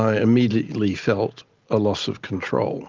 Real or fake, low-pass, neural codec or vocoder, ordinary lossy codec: real; 7.2 kHz; none; Opus, 32 kbps